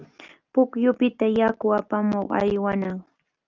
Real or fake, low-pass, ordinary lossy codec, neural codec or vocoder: real; 7.2 kHz; Opus, 24 kbps; none